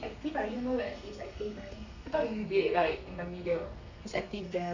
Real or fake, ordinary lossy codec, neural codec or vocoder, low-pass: fake; none; codec, 32 kHz, 1.9 kbps, SNAC; 7.2 kHz